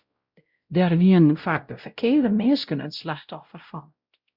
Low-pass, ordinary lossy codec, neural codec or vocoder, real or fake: 5.4 kHz; Opus, 64 kbps; codec, 16 kHz, 0.5 kbps, X-Codec, WavLM features, trained on Multilingual LibriSpeech; fake